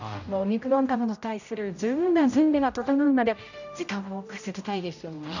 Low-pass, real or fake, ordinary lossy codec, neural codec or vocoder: 7.2 kHz; fake; none; codec, 16 kHz, 0.5 kbps, X-Codec, HuBERT features, trained on general audio